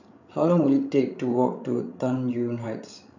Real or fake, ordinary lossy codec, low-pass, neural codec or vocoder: fake; none; 7.2 kHz; codec, 16 kHz, 16 kbps, FreqCodec, larger model